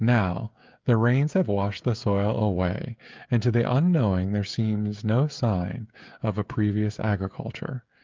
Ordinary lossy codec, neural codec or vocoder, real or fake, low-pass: Opus, 24 kbps; codec, 16 kHz, 16 kbps, FreqCodec, smaller model; fake; 7.2 kHz